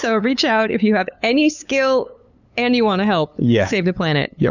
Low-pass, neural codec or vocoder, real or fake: 7.2 kHz; codec, 16 kHz, 8 kbps, FunCodec, trained on LibriTTS, 25 frames a second; fake